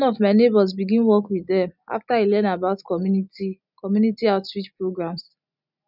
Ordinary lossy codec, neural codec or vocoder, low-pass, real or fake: none; none; 5.4 kHz; real